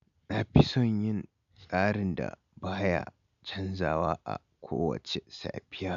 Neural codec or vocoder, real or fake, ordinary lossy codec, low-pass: none; real; AAC, 96 kbps; 7.2 kHz